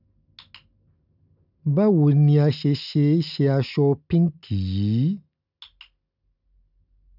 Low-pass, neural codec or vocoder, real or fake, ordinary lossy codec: 5.4 kHz; none; real; none